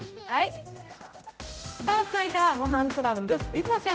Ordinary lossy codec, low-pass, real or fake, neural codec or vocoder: none; none; fake; codec, 16 kHz, 0.5 kbps, X-Codec, HuBERT features, trained on general audio